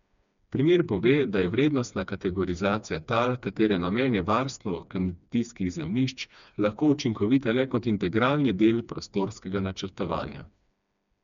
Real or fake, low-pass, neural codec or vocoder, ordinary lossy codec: fake; 7.2 kHz; codec, 16 kHz, 2 kbps, FreqCodec, smaller model; none